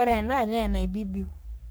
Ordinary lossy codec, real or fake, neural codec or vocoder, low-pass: none; fake; codec, 44.1 kHz, 3.4 kbps, Pupu-Codec; none